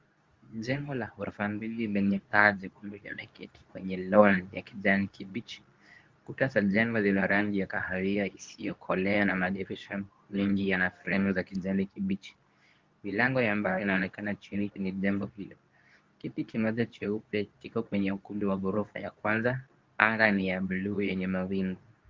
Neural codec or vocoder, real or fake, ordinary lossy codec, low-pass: codec, 24 kHz, 0.9 kbps, WavTokenizer, medium speech release version 2; fake; Opus, 32 kbps; 7.2 kHz